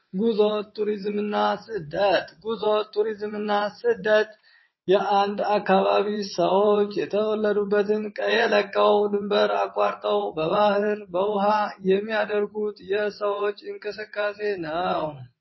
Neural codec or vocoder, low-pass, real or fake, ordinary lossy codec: vocoder, 22.05 kHz, 80 mel bands, WaveNeXt; 7.2 kHz; fake; MP3, 24 kbps